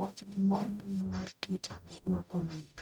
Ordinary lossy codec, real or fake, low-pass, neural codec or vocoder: none; fake; none; codec, 44.1 kHz, 0.9 kbps, DAC